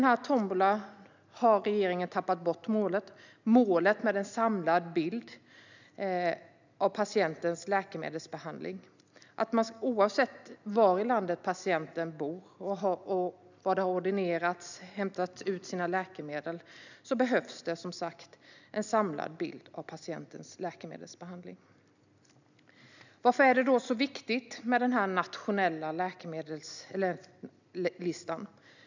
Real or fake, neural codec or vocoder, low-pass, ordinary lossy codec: real; none; 7.2 kHz; none